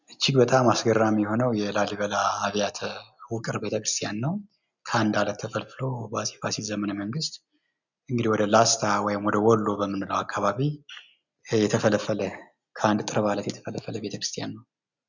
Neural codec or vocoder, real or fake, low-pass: none; real; 7.2 kHz